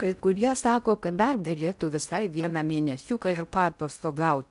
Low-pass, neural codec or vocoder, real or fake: 10.8 kHz; codec, 16 kHz in and 24 kHz out, 0.6 kbps, FocalCodec, streaming, 4096 codes; fake